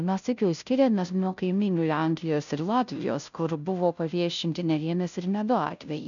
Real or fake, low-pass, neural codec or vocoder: fake; 7.2 kHz; codec, 16 kHz, 0.5 kbps, FunCodec, trained on Chinese and English, 25 frames a second